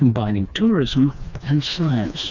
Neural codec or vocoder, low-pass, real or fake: codec, 16 kHz, 2 kbps, FreqCodec, smaller model; 7.2 kHz; fake